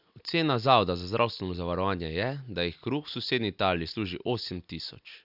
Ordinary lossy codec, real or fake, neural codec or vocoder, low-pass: none; real; none; 5.4 kHz